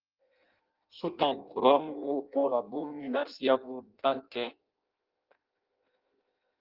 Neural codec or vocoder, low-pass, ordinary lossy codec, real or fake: codec, 16 kHz in and 24 kHz out, 0.6 kbps, FireRedTTS-2 codec; 5.4 kHz; Opus, 32 kbps; fake